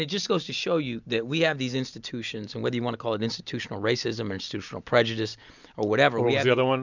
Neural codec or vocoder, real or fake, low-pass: none; real; 7.2 kHz